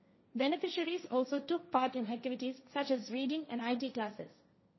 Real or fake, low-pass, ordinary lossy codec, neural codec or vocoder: fake; 7.2 kHz; MP3, 24 kbps; codec, 16 kHz, 1.1 kbps, Voila-Tokenizer